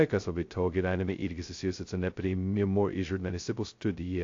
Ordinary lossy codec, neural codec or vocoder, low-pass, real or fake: AAC, 48 kbps; codec, 16 kHz, 0.2 kbps, FocalCodec; 7.2 kHz; fake